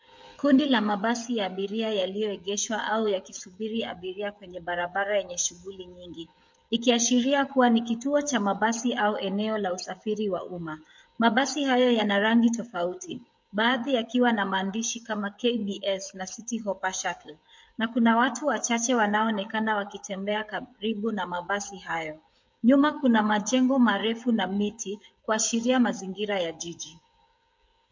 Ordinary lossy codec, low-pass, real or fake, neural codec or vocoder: MP3, 48 kbps; 7.2 kHz; fake; codec, 16 kHz, 16 kbps, FreqCodec, smaller model